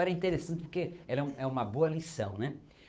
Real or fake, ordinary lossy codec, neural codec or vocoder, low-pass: fake; none; codec, 16 kHz, 8 kbps, FunCodec, trained on Chinese and English, 25 frames a second; none